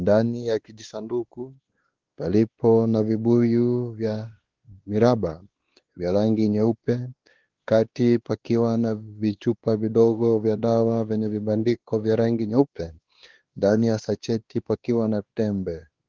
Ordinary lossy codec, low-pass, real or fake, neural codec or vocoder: Opus, 16 kbps; 7.2 kHz; fake; codec, 16 kHz, 2 kbps, X-Codec, WavLM features, trained on Multilingual LibriSpeech